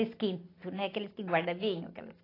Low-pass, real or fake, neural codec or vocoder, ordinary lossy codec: 5.4 kHz; real; none; AAC, 24 kbps